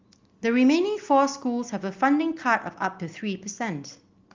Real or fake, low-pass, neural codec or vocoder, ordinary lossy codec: real; 7.2 kHz; none; Opus, 32 kbps